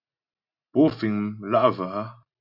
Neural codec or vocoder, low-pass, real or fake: none; 5.4 kHz; real